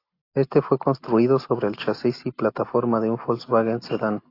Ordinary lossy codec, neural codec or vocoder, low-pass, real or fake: AAC, 32 kbps; none; 5.4 kHz; real